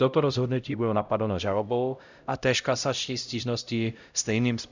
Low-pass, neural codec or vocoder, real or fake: 7.2 kHz; codec, 16 kHz, 0.5 kbps, X-Codec, HuBERT features, trained on LibriSpeech; fake